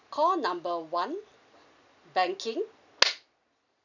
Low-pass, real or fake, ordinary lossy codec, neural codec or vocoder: 7.2 kHz; real; none; none